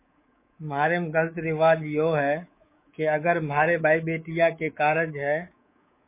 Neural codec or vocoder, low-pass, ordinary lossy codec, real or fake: codec, 24 kHz, 3.1 kbps, DualCodec; 3.6 kHz; MP3, 24 kbps; fake